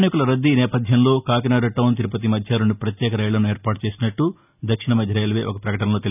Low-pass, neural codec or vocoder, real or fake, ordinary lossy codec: 3.6 kHz; none; real; none